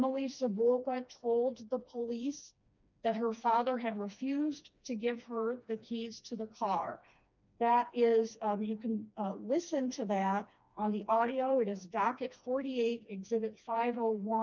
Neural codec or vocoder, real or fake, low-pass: codec, 16 kHz, 2 kbps, FreqCodec, smaller model; fake; 7.2 kHz